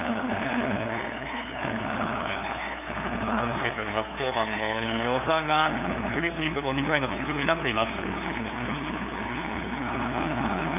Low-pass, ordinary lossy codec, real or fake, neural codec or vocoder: 3.6 kHz; none; fake; codec, 16 kHz, 2 kbps, FunCodec, trained on LibriTTS, 25 frames a second